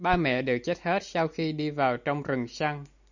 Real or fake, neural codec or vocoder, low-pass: real; none; 7.2 kHz